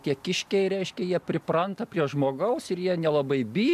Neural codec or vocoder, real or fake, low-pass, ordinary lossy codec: none; real; 14.4 kHz; AAC, 96 kbps